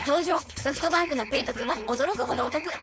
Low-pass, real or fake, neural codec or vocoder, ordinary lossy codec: none; fake; codec, 16 kHz, 4.8 kbps, FACodec; none